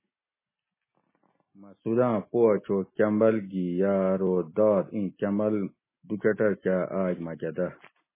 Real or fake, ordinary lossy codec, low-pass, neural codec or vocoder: real; MP3, 16 kbps; 3.6 kHz; none